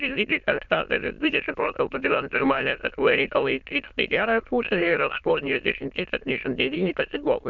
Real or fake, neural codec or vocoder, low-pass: fake; autoencoder, 22.05 kHz, a latent of 192 numbers a frame, VITS, trained on many speakers; 7.2 kHz